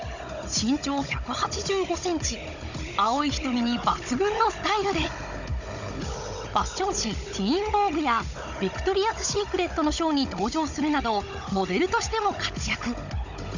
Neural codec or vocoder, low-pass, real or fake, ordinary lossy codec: codec, 16 kHz, 16 kbps, FunCodec, trained on Chinese and English, 50 frames a second; 7.2 kHz; fake; none